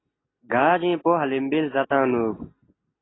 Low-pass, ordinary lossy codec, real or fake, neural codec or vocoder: 7.2 kHz; AAC, 16 kbps; real; none